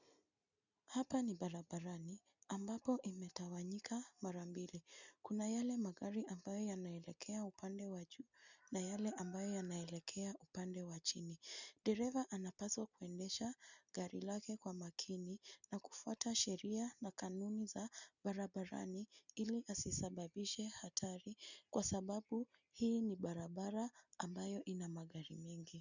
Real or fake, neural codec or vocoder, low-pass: real; none; 7.2 kHz